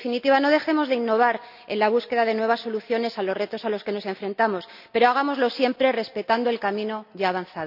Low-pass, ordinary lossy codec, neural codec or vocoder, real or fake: 5.4 kHz; none; none; real